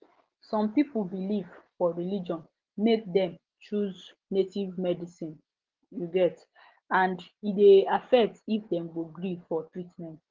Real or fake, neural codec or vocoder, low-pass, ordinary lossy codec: real; none; 7.2 kHz; Opus, 16 kbps